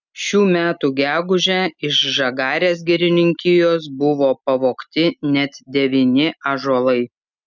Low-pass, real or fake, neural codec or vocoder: 7.2 kHz; real; none